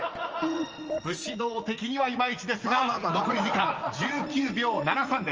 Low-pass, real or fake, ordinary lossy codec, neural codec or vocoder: 7.2 kHz; fake; Opus, 24 kbps; vocoder, 44.1 kHz, 80 mel bands, Vocos